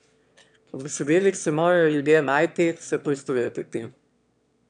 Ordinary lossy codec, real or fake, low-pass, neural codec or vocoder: none; fake; 9.9 kHz; autoencoder, 22.05 kHz, a latent of 192 numbers a frame, VITS, trained on one speaker